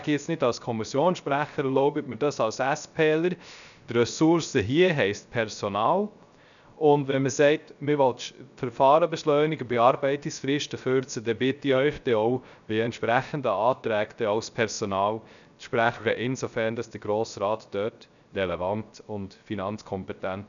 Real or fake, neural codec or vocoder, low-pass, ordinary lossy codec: fake; codec, 16 kHz, 0.3 kbps, FocalCodec; 7.2 kHz; none